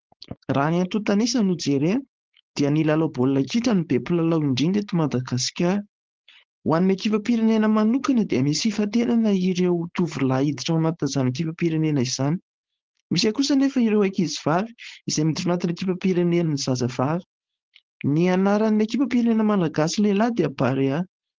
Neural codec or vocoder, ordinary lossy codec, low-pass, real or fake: codec, 16 kHz, 4.8 kbps, FACodec; Opus, 16 kbps; 7.2 kHz; fake